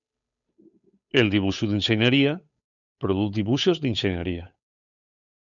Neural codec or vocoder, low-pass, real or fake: codec, 16 kHz, 8 kbps, FunCodec, trained on Chinese and English, 25 frames a second; 7.2 kHz; fake